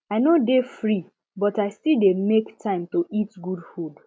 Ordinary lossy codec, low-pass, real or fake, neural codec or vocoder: none; none; real; none